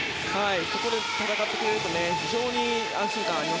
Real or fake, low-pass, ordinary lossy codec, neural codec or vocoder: real; none; none; none